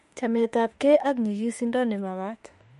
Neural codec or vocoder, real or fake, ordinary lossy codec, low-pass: autoencoder, 48 kHz, 32 numbers a frame, DAC-VAE, trained on Japanese speech; fake; MP3, 48 kbps; 14.4 kHz